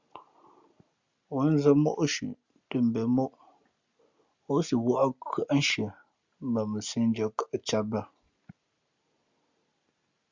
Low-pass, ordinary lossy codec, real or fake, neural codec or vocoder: 7.2 kHz; Opus, 64 kbps; real; none